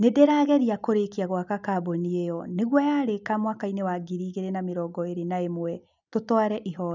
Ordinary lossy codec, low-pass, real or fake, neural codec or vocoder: none; 7.2 kHz; real; none